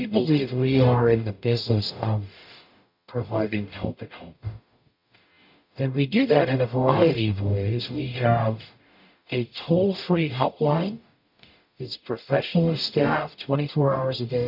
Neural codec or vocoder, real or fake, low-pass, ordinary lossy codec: codec, 44.1 kHz, 0.9 kbps, DAC; fake; 5.4 kHz; MP3, 48 kbps